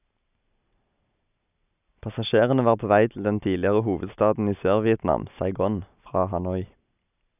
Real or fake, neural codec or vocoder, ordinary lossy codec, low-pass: real; none; none; 3.6 kHz